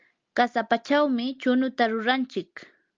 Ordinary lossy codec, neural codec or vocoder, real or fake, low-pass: Opus, 24 kbps; none; real; 7.2 kHz